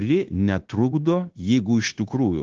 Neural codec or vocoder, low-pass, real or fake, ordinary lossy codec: codec, 16 kHz, 0.9 kbps, LongCat-Audio-Codec; 7.2 kHz; fake; Opus, 16 kbps